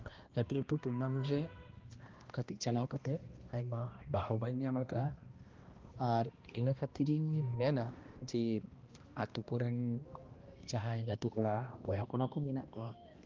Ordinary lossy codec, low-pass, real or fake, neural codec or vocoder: Opus, 32 kbps; 7.2 kHz; fake; codec, 16 kHz, 1 kbps, X-Codec, HuBERT features, trained on balanced general audio